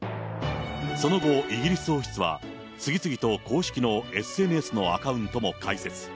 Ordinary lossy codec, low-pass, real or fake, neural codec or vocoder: none; none; real; none